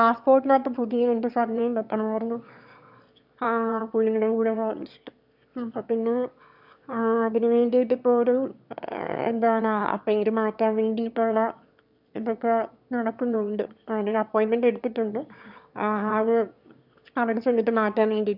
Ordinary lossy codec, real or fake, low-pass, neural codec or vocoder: none; fake; 5.4 kHz; autoencoder, 22.05 kHz, a latent of 192 numbers a frame, VITS, trained on one speaker